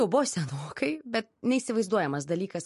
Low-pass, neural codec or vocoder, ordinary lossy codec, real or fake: 14.4 kHz; none; MP3, 48 kbps; real